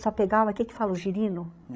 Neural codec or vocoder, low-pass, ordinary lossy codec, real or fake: codec, 16 kHz, 16 kbps, FreqCodec, larger model; none; none; fake